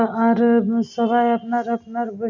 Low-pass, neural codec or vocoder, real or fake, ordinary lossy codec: 7.2 kHz; none; real; none